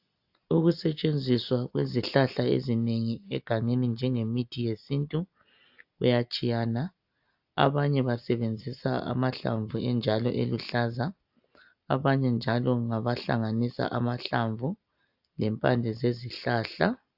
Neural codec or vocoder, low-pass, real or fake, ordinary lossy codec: none; 5.4 kHz; real; AAC, 48 kbps